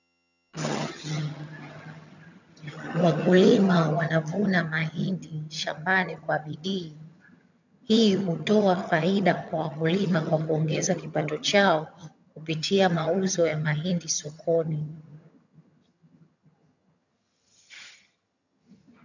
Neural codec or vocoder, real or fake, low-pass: vocoder, 22.05 kHz, 80 mel bands, HiFi-GAN; fake; 7.2 kHz